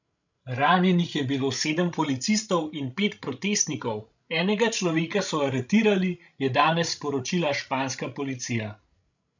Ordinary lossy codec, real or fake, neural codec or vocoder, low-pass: none; fake; codec, 16 kHz, 16 kbps, FreqCodec, larger model; 7.2 kHz